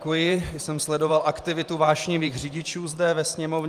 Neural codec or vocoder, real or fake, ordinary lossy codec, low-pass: vocoder, 44.1 kHz, 128 mel bands every 512 samples, BigVGAN v2; fake; Opus, 32 kbps; 14.4 kHz